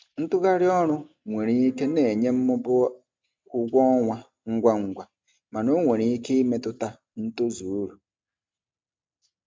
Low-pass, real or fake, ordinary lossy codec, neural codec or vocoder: none; real; none; none